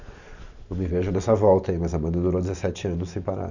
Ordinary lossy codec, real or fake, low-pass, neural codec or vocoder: none; real; 7.2 kHz; none